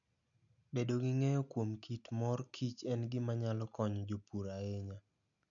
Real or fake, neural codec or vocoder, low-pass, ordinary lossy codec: real; none; 7.2 kHz; none